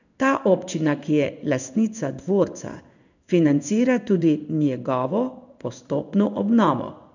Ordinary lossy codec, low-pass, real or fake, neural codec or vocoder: none; 7.2 kHz; fake; codec, 16 kHz in and 24 kHz out, 1 kbps, XY-Tokenizer